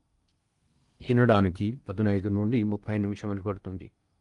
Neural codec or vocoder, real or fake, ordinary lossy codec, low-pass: codec, 16 kHz in and 24 kHz out, 0.8 kbps, FocalCodec, streaming, 65536 codes; fake; Opus, 32 kbps; 10.8 kHz